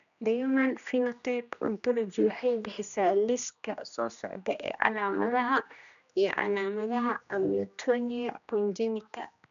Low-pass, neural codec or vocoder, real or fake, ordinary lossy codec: 7.2 kHz; codec, 16 kHz, 1 kbps, X-Codec, HuBERT features, trained on general audio; fake; none